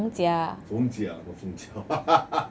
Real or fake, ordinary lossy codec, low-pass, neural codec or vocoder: real; none; none; none